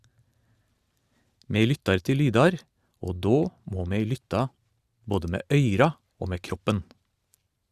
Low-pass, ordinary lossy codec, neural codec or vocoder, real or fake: 14.4 kHz; Opus, 64 kbps; vocoder, 44.1 kHz, 128 mel bands every 512 samples, BigVGAN v2; fake